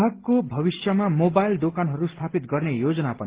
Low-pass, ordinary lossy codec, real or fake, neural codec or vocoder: 3.6 kHz; Opus, 16 kbps; real; none